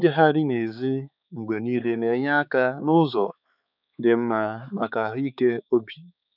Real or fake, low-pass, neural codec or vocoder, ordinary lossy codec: fake; 5.4 kHz; codec, 16 kHz, 4 kbps, X-Codec, HuBERT features, trained on balanced general audio; none